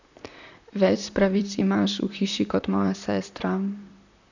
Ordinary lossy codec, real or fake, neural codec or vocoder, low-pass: none; fake; vocoder, 44.1 kHz, 128 mel bands, Pupu-Vocoder; 7.2 kHz